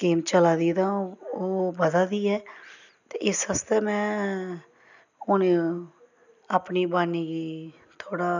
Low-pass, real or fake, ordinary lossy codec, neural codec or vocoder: 7.2 kHz; real; none; none